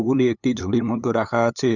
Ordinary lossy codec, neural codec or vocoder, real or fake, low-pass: none; codec, 16 kHz, 8 kbps, FunCodec, trained on LibriTTS, 25 frames a second; fake; 7.2 kHz